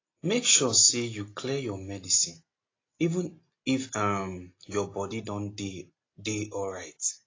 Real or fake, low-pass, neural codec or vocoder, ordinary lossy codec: real; 7.2 kHz; none; AAC, 32 kbps